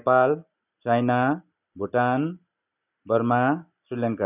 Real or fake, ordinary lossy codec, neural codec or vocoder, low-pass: real; none; none; 3.6 kHz